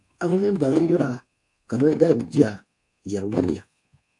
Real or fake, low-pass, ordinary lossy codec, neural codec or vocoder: fake; 10.8 kHz; AAC, 64 kbps; codec, 24 kHz, 1.2 kbps, DualCodec